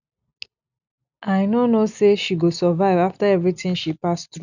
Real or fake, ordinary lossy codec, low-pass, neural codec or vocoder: real; none; 7.2 kHz; none